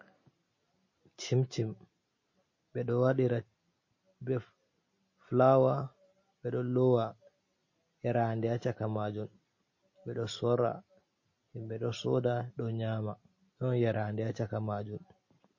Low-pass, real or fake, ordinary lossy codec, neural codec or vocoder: 7.2 kHz; real; MP3, 32 kbps; none